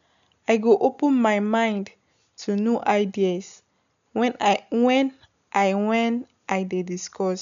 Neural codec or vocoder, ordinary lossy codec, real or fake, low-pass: none; none; real; 7.2 kHz